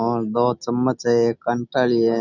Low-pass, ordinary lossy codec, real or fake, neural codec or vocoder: 7.2 kHz; none; real; none